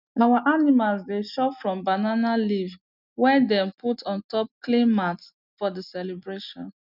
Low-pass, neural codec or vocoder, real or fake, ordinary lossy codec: 5.4 kHz; none; real; none